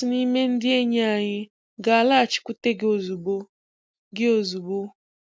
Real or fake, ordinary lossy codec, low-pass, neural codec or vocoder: real; none; none; none